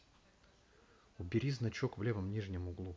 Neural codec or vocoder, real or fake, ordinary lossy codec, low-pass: none; real; none; none